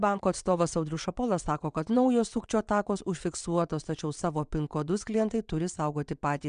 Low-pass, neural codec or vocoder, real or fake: 9.9 kHz; vocoder, 22.05 kHz, 80 mel bands, WaveNeXt; fake